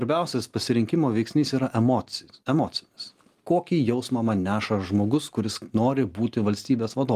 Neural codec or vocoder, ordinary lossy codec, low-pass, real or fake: none; Opus, 24 kbps; 14.4 kHz; real